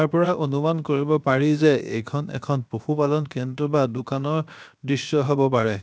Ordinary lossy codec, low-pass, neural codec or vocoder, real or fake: none; none; codec, 16 kHz, 0.7 kbps, FocalCodec; fake